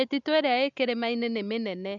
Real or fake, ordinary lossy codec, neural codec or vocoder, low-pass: real; none; none; 7.2 kHz